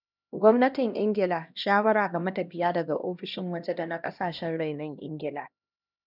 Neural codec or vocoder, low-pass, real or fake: codec, 16 kHz, 1 kbps, X-Codec, HuBERT features, trained on LibriSpeech; 5.4 kHz; fake